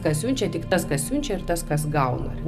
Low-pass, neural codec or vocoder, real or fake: 14.4 kHz; none; real